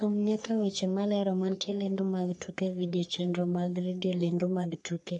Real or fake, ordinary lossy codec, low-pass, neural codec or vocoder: fake; none; 10.8 kHz; codec, 44.1 kHz, 3.4 kbps, Pupu-Codec